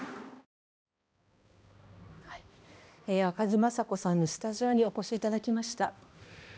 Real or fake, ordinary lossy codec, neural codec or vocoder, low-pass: fake; none; codec, 16 kHz, 1 kbps, X-Codec, HuBERT features, trained on balanced general audio; none